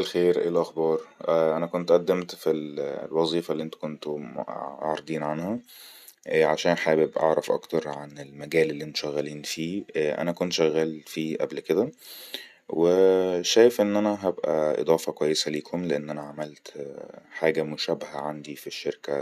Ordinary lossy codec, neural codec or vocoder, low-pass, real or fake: none; none; 14.4 kHz; real